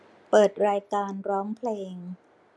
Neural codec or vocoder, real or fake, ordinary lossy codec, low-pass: none; real; none; none